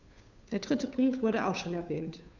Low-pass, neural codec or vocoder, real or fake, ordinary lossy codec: 7.2 kHz; codec, 16 kHz, 2 kbps, FunCodec, trained on Chinese and English, 25 frames a second; fake; none